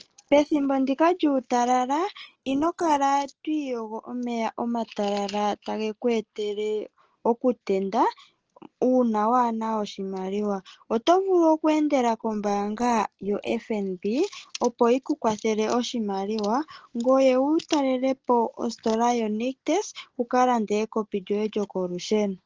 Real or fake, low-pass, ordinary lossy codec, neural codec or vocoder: real; 7.2 kHz; Opus, 16 kbps; none